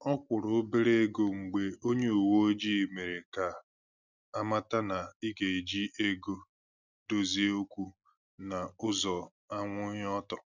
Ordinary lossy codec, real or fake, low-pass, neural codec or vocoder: none; real; 7.2 kHz; none